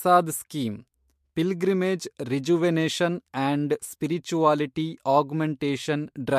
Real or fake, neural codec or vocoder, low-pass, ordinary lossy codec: fake; autoencoder, 48 kHz, 128 numbers a frame, DAC-VAE, trained on Japanese speech; 14.4 kHz; MP3, 64 kbps